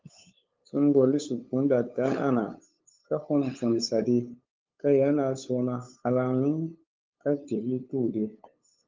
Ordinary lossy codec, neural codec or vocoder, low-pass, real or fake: Opus, 32 kbps; codec, 16 kHz, 8 kbps, FunCodec, trained on LibriTTS, 25 frames a second; 7.2 kHz; fake